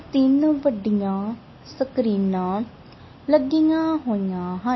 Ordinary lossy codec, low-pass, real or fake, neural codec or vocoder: MP3, 24 kbps; 7.2 kHz; real; none